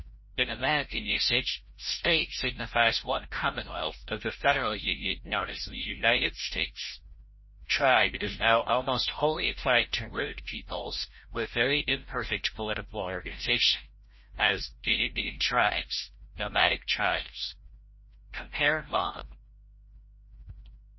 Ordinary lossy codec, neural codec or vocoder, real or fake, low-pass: MP3, 24 kbps; codec, 16 kHz, 0.5 kbps, FreqCodec, larger model; fake; 7.2 kHz